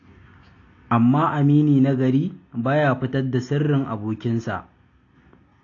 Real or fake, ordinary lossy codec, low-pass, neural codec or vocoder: real; AAC, 32 kbps; 7.2 kHz; none